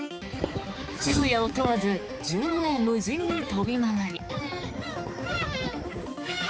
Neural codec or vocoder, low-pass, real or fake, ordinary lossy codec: codec, 16 kHz, 4 kbps, X-Codec, HuBERT features, trained on balanced general audio; none; fake; none